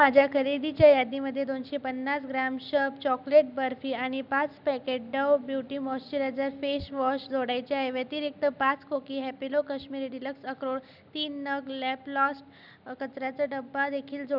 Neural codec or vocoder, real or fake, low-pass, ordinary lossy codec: none; real; 5.4 kHz; none